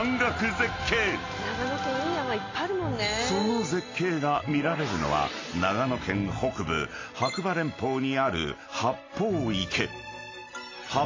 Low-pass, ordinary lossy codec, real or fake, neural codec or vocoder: 7.2 kHz; AAC, 32 kbps; real; none